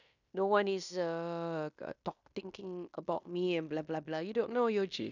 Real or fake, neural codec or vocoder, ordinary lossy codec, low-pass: fake; codec, 16 kHz in and 24 kHz out, 0.9 kbps, LongCat-Audio-Codec, fine tuned four codebook decoder; none; 7.2 kHz